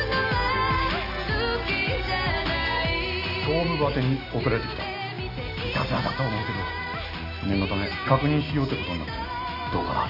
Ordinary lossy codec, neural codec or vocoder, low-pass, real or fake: none; none; 5.4 kHz; real